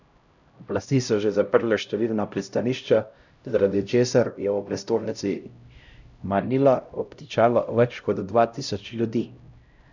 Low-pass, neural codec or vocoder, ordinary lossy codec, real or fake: 7.2 kHz; codec, 16 kHz, 0.5 kbps, X-Codec, HuBERT features, trained on LibriSpeech; none; fake